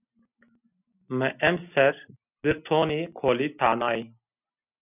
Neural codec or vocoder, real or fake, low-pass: none; real; 3.6 kHz